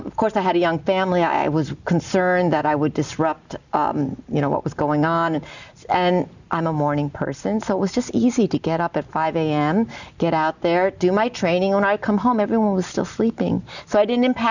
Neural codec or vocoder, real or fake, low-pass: none; real; 7.2 kHz